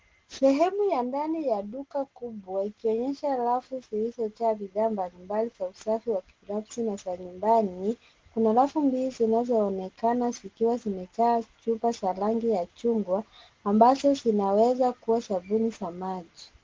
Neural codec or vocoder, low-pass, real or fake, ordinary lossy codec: none; 7.2 kHz; real; Opus, 16 kbps